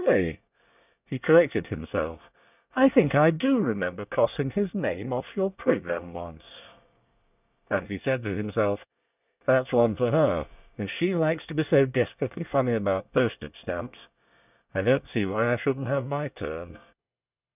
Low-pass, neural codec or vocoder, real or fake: 3.6 kHz; codec, 24 kHz, 1 kbps, SNAC; fake